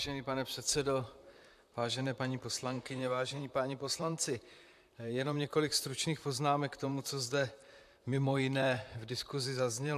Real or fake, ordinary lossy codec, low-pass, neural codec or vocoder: fake; AAC, 96 kbps; 14.4 kHz; vocoder, 44.1 kHz, 128 mel bands, Pupu-Vocoder